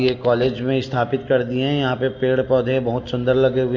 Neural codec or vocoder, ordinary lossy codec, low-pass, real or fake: none; AAC, 48 kbps; 7.2 kHz; real